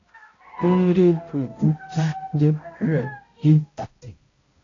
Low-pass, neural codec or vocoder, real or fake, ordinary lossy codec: 7.2 kHz; codec, 16 kHz, 0.5 kbps, X-Codec, HuBERT features, trained on balanced general audio; fake; AAC, 32 kbps